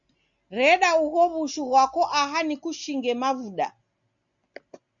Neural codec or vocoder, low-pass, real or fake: none; 7.2 kHz; real